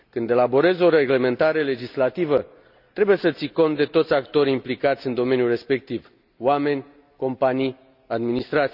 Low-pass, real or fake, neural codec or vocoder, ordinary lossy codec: 5.4 kHz; real; none; none